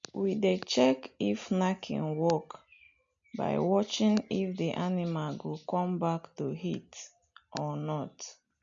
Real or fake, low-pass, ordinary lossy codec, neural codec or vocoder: real; 7.2 kHz; MP3, 64 kbps; none